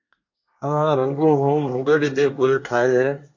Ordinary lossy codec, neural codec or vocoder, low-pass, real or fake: MP3, 48 kbps; codec, 24 kHz, 1 kbps, SNAC; 7.2 kHz; fake